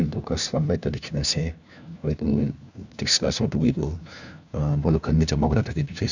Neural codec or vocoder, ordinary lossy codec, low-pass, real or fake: codec, 16 kHz, 1 kbps, FunCodec, trained on LibriTTS, 50 frames a second; none; 7.2 kHz; fake